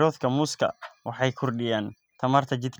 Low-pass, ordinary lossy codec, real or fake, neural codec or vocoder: none; none; real; none